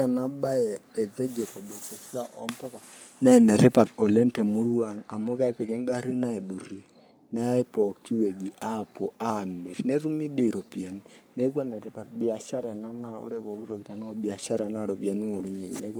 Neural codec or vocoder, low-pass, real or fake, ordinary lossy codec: codec, 44.1 kHz, 3.4 kbps, Pupu-Codec; none; fake; none